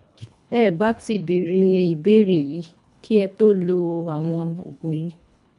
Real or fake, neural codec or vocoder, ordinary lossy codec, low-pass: fake; codec, 24 kHz, 1.5 kbps, HILCodec; none; 10.8 kHz